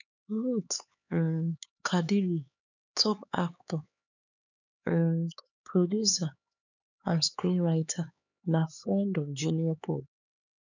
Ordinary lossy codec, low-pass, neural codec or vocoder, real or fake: none; 7.2 kHz; codec, 16 kHz, 4 kbps, X-Codec, HuBERT features, trained on LibriSpeech; fake